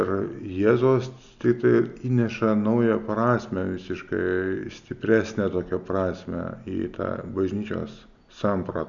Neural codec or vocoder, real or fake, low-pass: none; real; 7.2 kHz